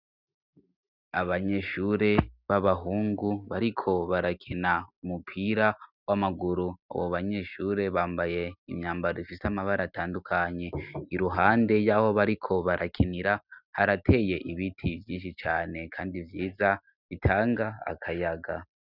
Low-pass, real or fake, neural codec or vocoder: 5.4 kHz; real; none